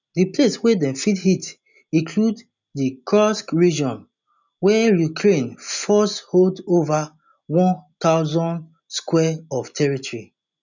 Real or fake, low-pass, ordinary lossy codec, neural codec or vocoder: real; 7.2 kHz; none; none